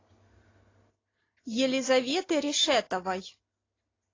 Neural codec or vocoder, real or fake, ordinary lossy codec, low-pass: none; real; AAC, 32 kbps; 7.2 kHz